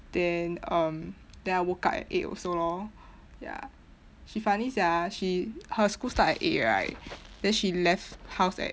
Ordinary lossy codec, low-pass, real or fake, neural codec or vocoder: none; none; real; none